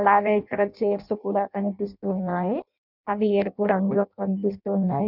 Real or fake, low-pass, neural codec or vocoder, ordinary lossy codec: fake; 5.4 kHz; codec, 16 kHz in and 24 kHz out, 0.6 kbps, FireRedTTS-2 codec; none